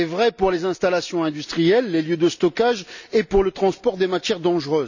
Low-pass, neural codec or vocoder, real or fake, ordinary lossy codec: 7.2 kHz; none; real; none